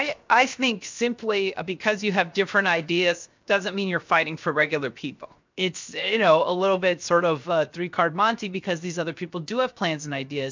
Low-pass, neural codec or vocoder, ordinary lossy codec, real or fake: 7.2 kHz; codec, 16 kHz, 0.7 kbps, FocalCodec; MP3, 64 kbps; fake